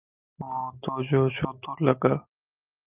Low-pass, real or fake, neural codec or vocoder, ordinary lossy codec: 3.6 kHz; real; none; Opus, 24 kbps